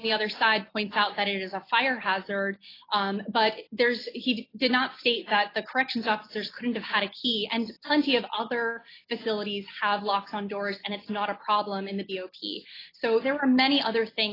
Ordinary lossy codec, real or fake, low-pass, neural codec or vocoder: AAC, 24 kbps; real; 5.4 kHz; none